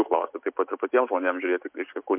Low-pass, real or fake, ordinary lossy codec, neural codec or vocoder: 3.6 kHz; real; MP3, 32 kbps; none